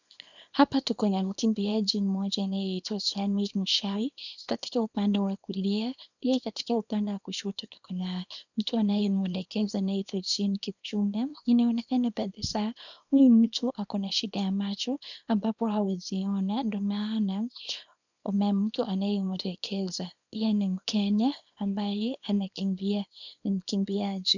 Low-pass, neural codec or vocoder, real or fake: 7.2 kHz; codec, 24 kHz, 0.9 kbps, WavTokenizer, small release; fake